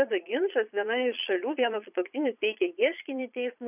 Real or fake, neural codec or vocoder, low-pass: fake; vocoder, 22.05 kHz, 80 mel bands, Vocos; 3.6 kHz